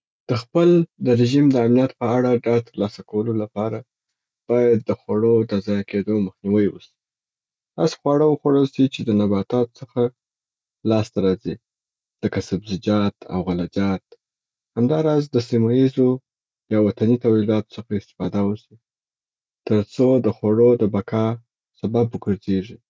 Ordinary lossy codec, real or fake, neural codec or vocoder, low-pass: none; real; none; none